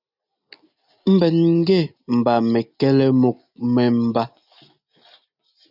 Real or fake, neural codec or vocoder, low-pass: real; none; 5.4 kHz